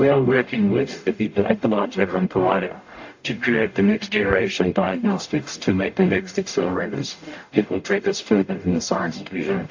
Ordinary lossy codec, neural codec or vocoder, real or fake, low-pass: AAC, 48 kbps; codec, 44.1 kHz, 0.9 kbps, DAC; fake; 7.2 kHz